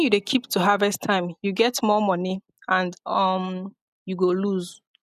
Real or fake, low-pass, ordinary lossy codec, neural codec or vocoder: real; 14.4 kHz; none; none